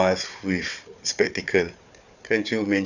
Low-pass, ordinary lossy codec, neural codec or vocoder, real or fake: 7.2 kHz; none; codec, 16 kHz, 4 kbps, FunCodec, trained on Chinese and English, 50 frames a second; fake